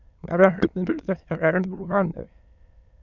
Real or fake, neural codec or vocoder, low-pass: fake; autoencoder, 22.05 kHz, a latent of 192 numbers a frame, VITS, trained on many speakers; 7.2 kHz